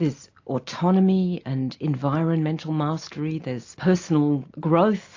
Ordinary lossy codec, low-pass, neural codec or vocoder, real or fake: AAC, 48 kbps; 7.2 kHz; none; real